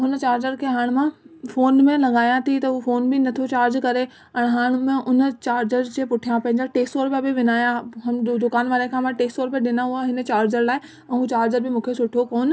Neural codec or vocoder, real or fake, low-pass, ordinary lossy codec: none; real; none; none